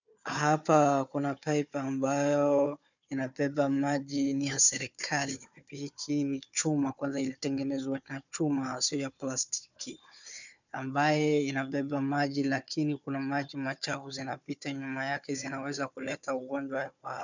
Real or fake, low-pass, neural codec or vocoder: fake; 7.2 kHz; codec, 16 kHz, 4 kbps, FunCodec, trained on Chinese and English, 50 frames a second